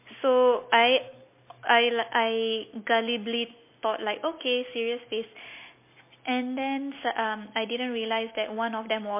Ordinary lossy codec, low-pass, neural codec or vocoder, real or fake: MP3, 24 kbps; 3.6 kHz; none; real